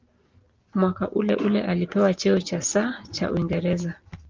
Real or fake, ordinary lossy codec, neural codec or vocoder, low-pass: real; Opus, 32 kbps; none; 7.2 kHz